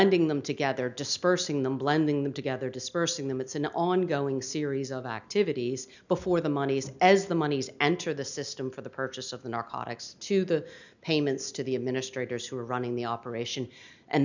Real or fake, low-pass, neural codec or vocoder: real; 7.2 kHz; none